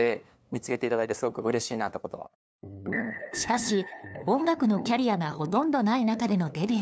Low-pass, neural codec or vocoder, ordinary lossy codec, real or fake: none; codec, 16 kHz, 2 kbps, FunCodec, trained on LibriTTS, 25 frames a second; none; fake